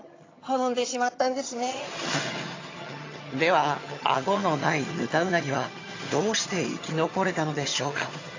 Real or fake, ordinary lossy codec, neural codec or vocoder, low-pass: fake; AAC, 32 kbps; vocoder, 22.05 kHz, 80 mel bands, HiFi-GAN; 7.2 kHz